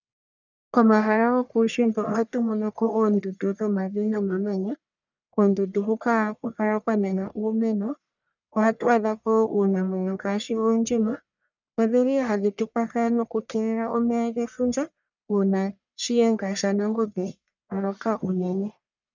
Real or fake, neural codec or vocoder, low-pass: fake; codec, 44.1 kHz, 1.7 kbps, Pupu-Codec; 7.2 kHz